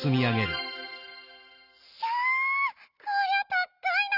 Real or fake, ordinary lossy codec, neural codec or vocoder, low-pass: real; none; none; 5.4 kHz